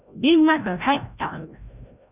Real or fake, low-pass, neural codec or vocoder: fake; 3.6 kHz; codec, 16 kHz, 0.5 kbps, FreqCodec, larger model